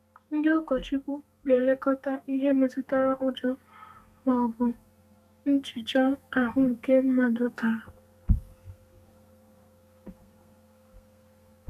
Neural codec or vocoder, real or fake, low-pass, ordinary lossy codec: codec, 32 kHz, 1.9 kbps, SNAC; fake; 14.4 kHz; none